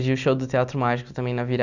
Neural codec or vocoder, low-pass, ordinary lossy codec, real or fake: none; 7.2 kHz; none; real